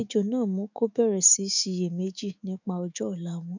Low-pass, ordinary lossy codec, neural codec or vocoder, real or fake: 7.2 kHz; none; autoencoder, 48 kHz, 128 numbers a frame, DAC-VAE, trained on Japanese speech; fake